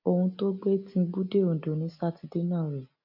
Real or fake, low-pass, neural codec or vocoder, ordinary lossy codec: real; 5.4 kHz; none; none